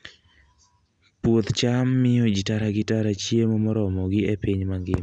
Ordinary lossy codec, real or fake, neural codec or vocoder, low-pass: none; real; none; 9.9 kHz